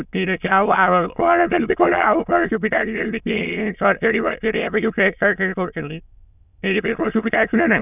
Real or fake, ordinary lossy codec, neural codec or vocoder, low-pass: fake; none; autoencoder, 22.05 kHz, a latent of 192 numbers a frame, VITS, trained on many speakers; 3.6 kHz